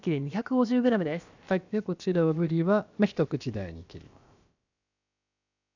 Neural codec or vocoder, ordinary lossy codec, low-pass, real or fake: codec, 16 kHz, about 1 kbps, DyCAST, with the encoder's durations; none; 7.2 kHz; fake